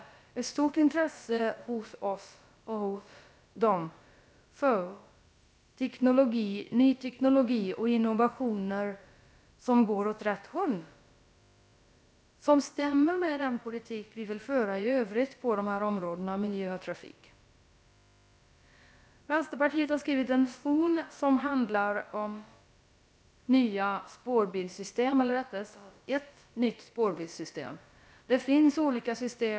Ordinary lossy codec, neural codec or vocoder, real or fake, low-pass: none; codec, 16 kHz, about 1 kbps, DyCAST, with the encoder's durations; fake; none